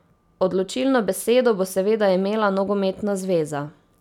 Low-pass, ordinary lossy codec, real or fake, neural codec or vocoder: 19.8 kHz; none; real; none